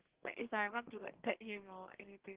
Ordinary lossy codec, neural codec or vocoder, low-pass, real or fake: Opus, 16 kbps; codec, 32 kHz, 1.9 kbps, SNAC; 3.6 kHz; fake